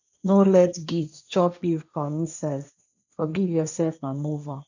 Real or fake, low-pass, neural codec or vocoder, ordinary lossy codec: fake; 7.2 kHz; codec, 16 kHz, 1.1 kbps, Voila-Tokenizer; none